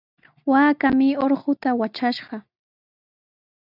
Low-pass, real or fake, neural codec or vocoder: 5.4 kHz; real; none